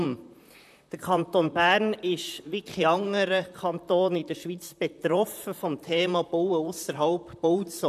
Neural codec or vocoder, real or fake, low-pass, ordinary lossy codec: vocoder, 44.1 kHz, 128 mel bands, Pupu-Vocoder; fake; 14.4 kHz; none